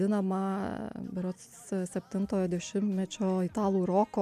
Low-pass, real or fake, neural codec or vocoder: 14.4 kHz; real; none